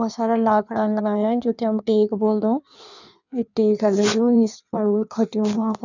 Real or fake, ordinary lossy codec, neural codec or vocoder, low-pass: fake; none; codec, 16 kHz in and 24 kHz out, 1.1 kbps, FireRedTTS-2 codec; 7.2 kHz